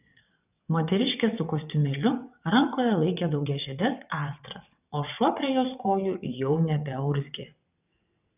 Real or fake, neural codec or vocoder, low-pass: fake; vocoder, 22.05 kHz, 80 mel bands, WaveNeXt; 3.6 kHz